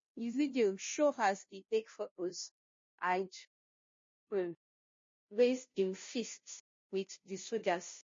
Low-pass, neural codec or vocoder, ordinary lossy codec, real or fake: 7.2 kHz; codec, 16 kHz, 0.5 kbps, FunCodec, trained on Chinese and English, 25 frames a second; MP3, 32 kbps; fake